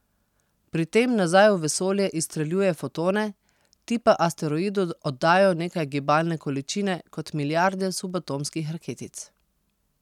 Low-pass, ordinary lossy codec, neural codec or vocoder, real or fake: 19.8 kHz; none; none; real